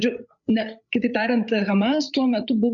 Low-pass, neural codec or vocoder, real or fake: 7.2 kHz; codec, 16 kHz, 8 kbps, FreqCodec, larger model; fake